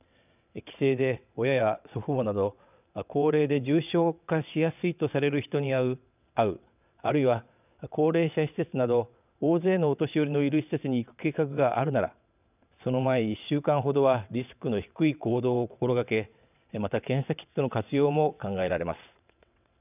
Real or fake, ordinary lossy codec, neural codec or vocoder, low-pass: fake; none; vocoder, 22.05 kHz, 80 mel bands, WaveNeXt; 3.6 kHz